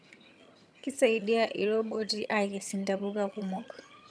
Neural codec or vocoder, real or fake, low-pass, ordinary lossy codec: vocoder, 22.05 kHz, 80 mel bands, HiFi-GAN; fake; none; none